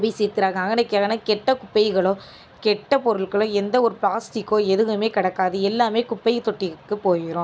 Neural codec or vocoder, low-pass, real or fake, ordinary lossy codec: none; none; real; none